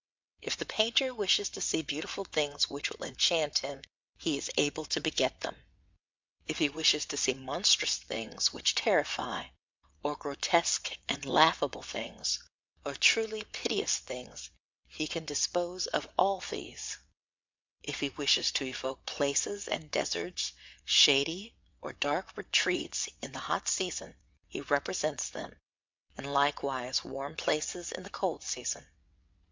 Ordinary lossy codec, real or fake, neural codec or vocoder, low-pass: MP3, 64 kbps; fake; vocoder, 22.05 kHz, 80 mel bands, WaveNeXt; 7.2 kHz